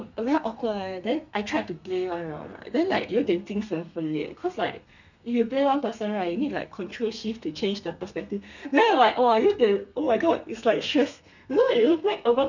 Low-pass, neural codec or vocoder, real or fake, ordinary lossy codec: 7.2 kHz; codec, 32 kHz, 1.9 kbps, SNAC; fake; none